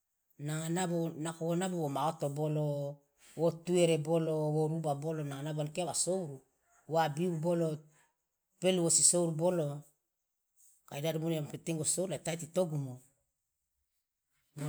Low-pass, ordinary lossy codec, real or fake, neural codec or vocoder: none; none; real; none